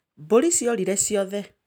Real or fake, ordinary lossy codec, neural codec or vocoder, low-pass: real; none; none; none